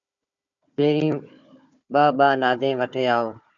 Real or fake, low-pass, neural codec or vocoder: fake; 7.2 kHz; codec, 16 kHz, 4 kbps, FunCodec, trained on Chinese and English, 50 frames a second